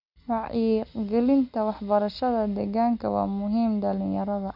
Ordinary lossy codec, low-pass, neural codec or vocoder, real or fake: none; 5.4 kHz; none; real